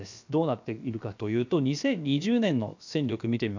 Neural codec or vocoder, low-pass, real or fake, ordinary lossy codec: codec, 16 kHz, about 1 kbps, DyCAST, with the encoder's durations; 7.2 kHz; fake; none